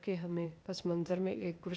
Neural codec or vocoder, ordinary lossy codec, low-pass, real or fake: codec, 16 kHz, 0.8 kbps, ZipCodec; none; none; fake